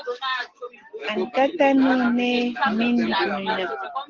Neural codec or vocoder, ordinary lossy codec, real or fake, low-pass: none; Opus, 16 kbps; real; 7.2 kHz